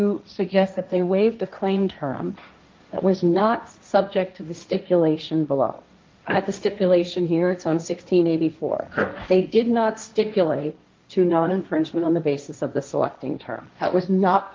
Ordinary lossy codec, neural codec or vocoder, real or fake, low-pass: Opus, 32 kbps; codec, 16 kHz, 1.1 kbps, Voila-Tokenizer; fake; 7.2 kHz